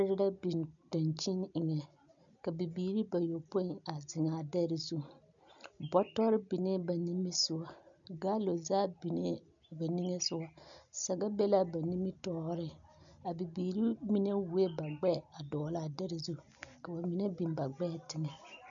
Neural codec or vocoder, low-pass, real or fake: none; 7.2 kHz; real